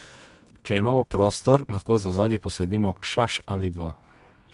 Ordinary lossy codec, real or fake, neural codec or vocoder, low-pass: MP3, 64 kbps; fake; codec, 24 kHz, 0.9 kbps, WavTokenizer, medium music audio release; 10.8 kHz